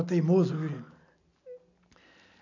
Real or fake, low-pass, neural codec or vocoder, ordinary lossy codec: real; 7.2 kHz; none; none